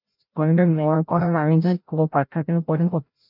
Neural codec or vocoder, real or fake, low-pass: codec, 16 kHz, 0.5 kbps, FreqCodec, larger model; fake; 5.4 kHz